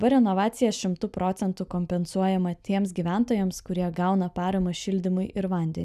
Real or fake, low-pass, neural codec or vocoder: real; 14.4 kHz; none